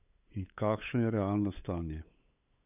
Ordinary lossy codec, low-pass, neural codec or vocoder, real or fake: none; 3.6 kHz; codec, 16 kHz, 8 kbps, FunCodec, trained on LibriTTS, 25 frames a second; fake